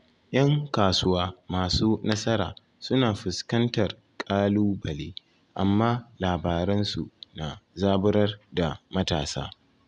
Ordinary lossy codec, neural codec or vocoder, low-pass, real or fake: none; none; 10.8 kHz; real